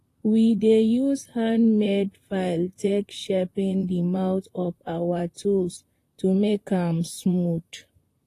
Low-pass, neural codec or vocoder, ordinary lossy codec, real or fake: 14.4 kHz; vocoder, 44.1 kHz, 128 mel bands, Pupu-Vocoder; AAC, 48 kbps; fake